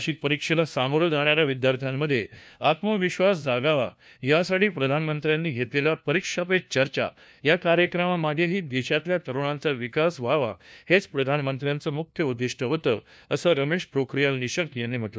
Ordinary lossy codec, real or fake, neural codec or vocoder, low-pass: none; fake; codec, 16 kHz, 1 kbps, FunCodec, trained on LibriTTS, 50 frames a second; none